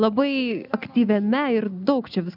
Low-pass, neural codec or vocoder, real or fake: 5.4 kHz; none; real